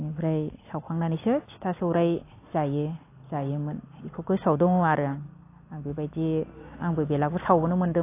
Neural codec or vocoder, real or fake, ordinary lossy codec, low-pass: none; real; AAC, 24 kbps; 3.6 kHz